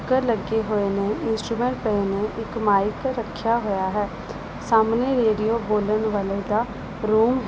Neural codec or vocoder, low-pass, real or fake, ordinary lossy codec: none; none; real; none